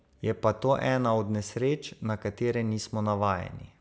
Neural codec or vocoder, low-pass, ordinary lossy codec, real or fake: none; none; none; real